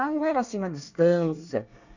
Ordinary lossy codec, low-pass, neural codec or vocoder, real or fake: none; 7.2 kHz; codec, 24 kHz, 1 kbps, SNAC; fake